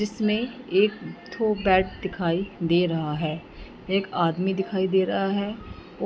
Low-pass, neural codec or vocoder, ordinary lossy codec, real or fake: none; none; none; real